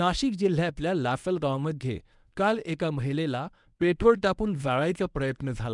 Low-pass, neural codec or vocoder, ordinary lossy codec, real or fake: 10.8 kHz; codec, 24 kHz, 0.9 kbps, WavTokenizer, medium speech release version 1; none; fake